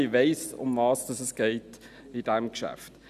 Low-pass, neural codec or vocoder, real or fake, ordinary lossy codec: 14.4 kHz; none; real; none